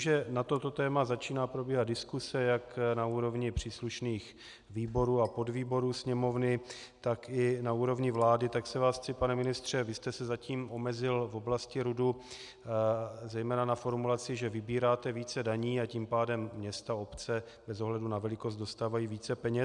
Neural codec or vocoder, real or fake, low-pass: none; real; 10.8 kHz